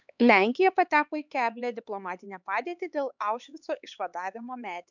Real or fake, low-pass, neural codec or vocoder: fake; 7.2 kHz; codec, 16 kHz, 4 kbps, X-Codec, HuBERT features, trained on LibriSpeech